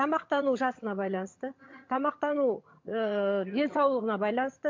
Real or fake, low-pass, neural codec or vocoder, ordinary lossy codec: fake; 7.2 kHz; vocoder, 22.05 kHz, 80 mel bands, HiFi-GAN; MP3, 48 kbps